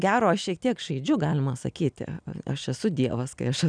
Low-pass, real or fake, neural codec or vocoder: 9.9 kHz; real; none